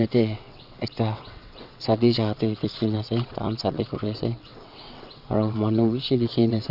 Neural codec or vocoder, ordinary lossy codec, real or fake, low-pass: vocoder, 22.05 kHz, 80 mel bands, WaveNeXt; none; fake; 5.4 kHz